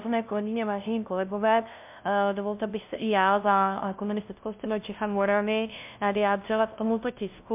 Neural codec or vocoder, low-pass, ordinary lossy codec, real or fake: codec, 16 kHz, 0.5 kbps, FunCodec, trained on LibriTTS, 25 frames a second; 3.6 kHz; MP3, 32 kbps; fake